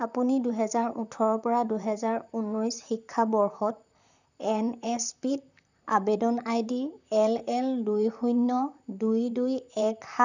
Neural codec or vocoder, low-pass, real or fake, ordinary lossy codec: vocoder, 44.1 kHz, 128 mel bands, Pupu-Vocoder; 7.2 kHz; fake; none